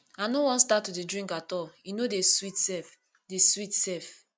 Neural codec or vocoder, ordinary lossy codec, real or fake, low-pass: none; none; real; none